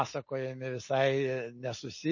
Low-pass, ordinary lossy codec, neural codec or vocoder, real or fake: 7.2 kHz; MP3, 32 kbps; none; real